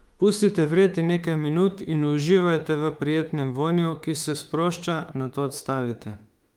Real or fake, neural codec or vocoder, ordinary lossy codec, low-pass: fake; autoencoder, 48 kHz, 32 numbers a frame, DAC-VAE, trained on Japanese speech; Opus, 32 kbps; 19.8 kHz